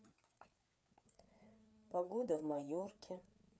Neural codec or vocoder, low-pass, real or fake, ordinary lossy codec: codec, 16 kHz, 4 kbps, FreqCodec, larger model; none; fake; none